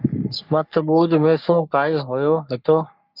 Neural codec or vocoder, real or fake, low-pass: codec, 44.1 kHz, 3.4 kbps, Pupu-Codec; fake; 5.4 kHz